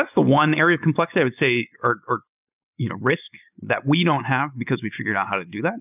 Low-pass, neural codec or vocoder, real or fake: 3.6 kHz; none; real